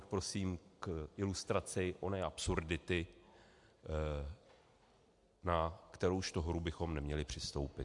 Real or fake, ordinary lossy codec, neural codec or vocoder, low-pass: real; MP3, 64 kbps; none; 10.8 kHz